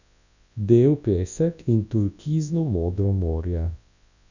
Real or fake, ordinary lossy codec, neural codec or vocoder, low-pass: fake; none; codec, 24 kHz, 0.9 kbps, WavTokenizer, large speech release; 7.2 kHz